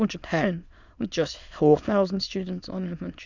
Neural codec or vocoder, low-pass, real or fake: autoencoder, 22.05 kHz, a latent of 192 numbers a frame, VITS, trained on many speakers; 7.2 kHz; fake